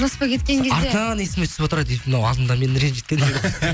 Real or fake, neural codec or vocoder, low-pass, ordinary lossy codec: real; none; none; none